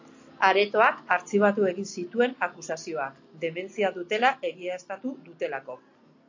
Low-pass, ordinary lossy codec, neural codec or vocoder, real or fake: 7.2 kHz; AAC, 48 kbps; none; real